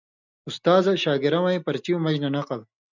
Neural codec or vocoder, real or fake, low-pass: none; real; 7.2 kHz